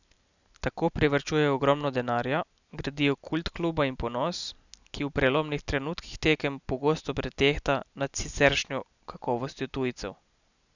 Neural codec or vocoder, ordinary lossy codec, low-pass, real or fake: none; none; 7.2 kHz; real